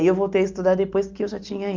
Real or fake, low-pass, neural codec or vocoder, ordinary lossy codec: real; 7.2 kHz; none; Opus, 24 kbps